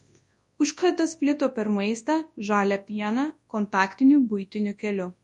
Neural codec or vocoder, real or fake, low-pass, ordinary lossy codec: codec, 24 kHz, 0.9 kbps, WavTokenizer, large speech release; fake; 10.8 kHz; MP3, 48 kbps